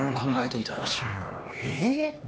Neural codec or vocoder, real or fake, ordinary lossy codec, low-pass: codec, 16 kHz, 2 kbps, X-Codec, HuBERT features, trained on LibriSpeech; fake; none; none